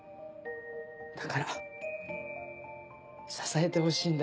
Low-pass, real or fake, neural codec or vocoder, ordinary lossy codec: none; real; none; none